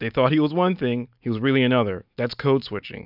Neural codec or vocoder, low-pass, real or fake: none; 5.4 kHz; real